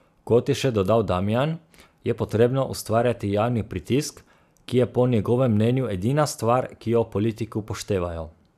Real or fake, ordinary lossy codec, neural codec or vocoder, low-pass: fake; none; vocoder, 44.1 kHz, 128 mel bands every 512 samples, BigVGAN v2; 14.4 kHz